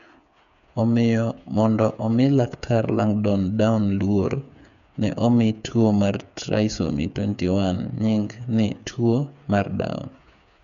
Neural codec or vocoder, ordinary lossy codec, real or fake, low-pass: codec, 16 kHz, 8 kbps, FreqCodec, smaller model; none; fake; 7.2 kHz